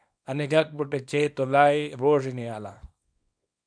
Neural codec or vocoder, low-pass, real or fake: codec, 24 kHz, 0.9 kbps, WavTokenizer, small release; 9.9 kHz; fake